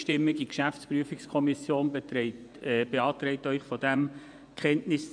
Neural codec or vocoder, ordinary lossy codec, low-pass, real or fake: vocoder, 24 kHz, 100 mel bands, Vocos; none; 9.9 kHz; fake